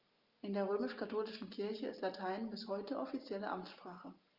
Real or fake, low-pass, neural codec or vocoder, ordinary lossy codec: real; 5.4 kHz; none; Opus, 24 kbps